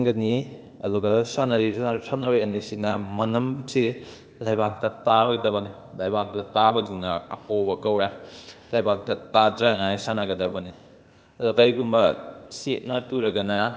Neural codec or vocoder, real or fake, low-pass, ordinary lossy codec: codec, 16 kHz, 0.8 kbps, ZipCodec; fake; none; none